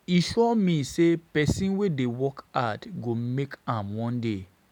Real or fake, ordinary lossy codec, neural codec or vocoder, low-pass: real; none; none; 19.8 kHz